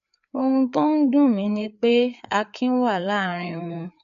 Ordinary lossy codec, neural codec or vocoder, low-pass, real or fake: none; codec, 16 kHz, 4 kbps, FreqCodec, larger model; 7.2 kHz; fake